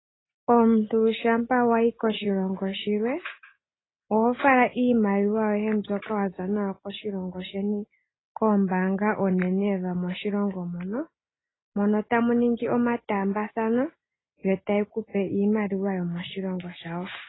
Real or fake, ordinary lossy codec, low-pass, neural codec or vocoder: real; AAC, 16 kbps; 7.2 kHz; none